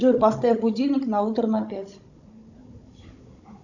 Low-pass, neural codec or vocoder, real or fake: 7.2 kHz; codec, 16 kHz, 16 kbps, FunCodec, trained on Chinese and English, 50 frames a second; fake